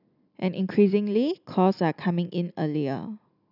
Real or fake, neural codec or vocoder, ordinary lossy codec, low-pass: real; none; none; 5.4 kHz